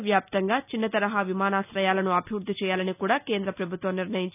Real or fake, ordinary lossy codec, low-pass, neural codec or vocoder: real; none; 3.6 kHz; none